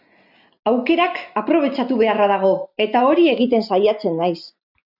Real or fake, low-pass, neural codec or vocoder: real; 5.4 kHz; none